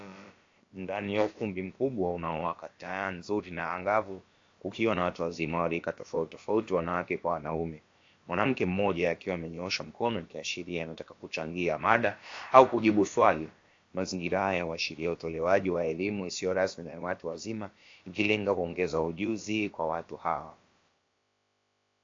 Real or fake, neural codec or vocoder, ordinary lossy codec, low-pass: fake; codec, 16 kHz, about 1 kbps, DyCAST, with the encoder's durations; AAC, 48 kbps; 7.2 kHz